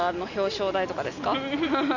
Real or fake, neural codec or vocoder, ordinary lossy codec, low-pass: real; none; none; 7.2 kHz